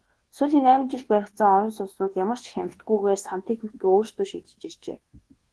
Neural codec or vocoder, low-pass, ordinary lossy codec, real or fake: codec, 24 kHz, 1.2 kbps, DualCodec; 10.8 kHz; Opus, 16 kbps; fake